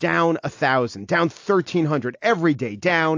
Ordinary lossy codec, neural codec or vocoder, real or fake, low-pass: AAC, 48 kbps; none; real; 7.2 kHz